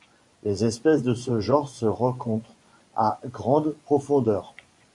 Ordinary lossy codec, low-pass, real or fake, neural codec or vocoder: MP3, 64 kbps; 10.8 kHz; fake; vocoder, 24 kHz, 100 mel bands, Vocos